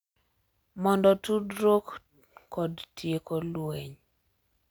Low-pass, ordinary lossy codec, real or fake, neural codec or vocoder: none; none; real; none